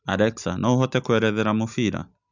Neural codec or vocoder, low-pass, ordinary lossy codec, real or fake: none; 7.2 kHz; none; real